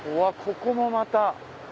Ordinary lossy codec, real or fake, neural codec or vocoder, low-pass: none; real; none; none